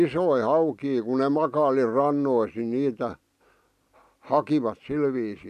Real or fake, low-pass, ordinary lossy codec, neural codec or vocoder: real; 14.4 kHz; none; none